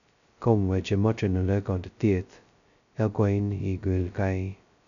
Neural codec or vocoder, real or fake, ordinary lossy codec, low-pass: codec, 16 kHz, 0.2 kbps, FocalCodec; fake; none; 7.2 kHz